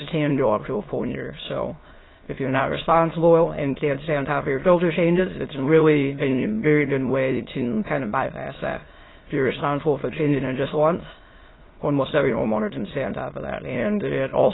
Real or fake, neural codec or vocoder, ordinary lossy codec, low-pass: fake; autoencoder, 22.05 kHz, a latent of 192 numbers a frame, VITS, trained on many speakers; AAC, 16 kbps; 7.2 kHz